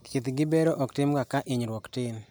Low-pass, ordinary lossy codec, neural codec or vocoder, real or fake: none; none; none; real